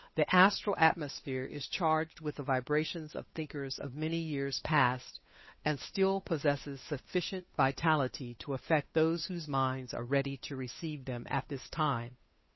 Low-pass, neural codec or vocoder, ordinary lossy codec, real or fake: 7.2 kHz; codec, 16 kHz, 8 kbps, FunCodec, trained on Chinese and English, 25 frames a second; MP3, 24 kbps; fake